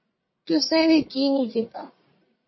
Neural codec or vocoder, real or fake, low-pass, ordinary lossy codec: codec, 44.1 kHz, 1.7 kbps, Pupu-Codec; fake; 7.2 kHz; MP3, 24 kbps